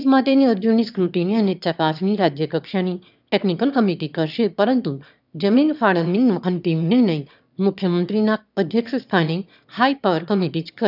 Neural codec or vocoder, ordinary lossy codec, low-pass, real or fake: autoencoder, 22.05 kHz, a latent of 192 numbers a frame, VITS, trained on one speaker; none; 5.4 kHz; fake